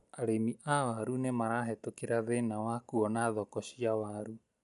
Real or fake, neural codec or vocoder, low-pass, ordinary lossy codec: real; none; 10.8 kHz; none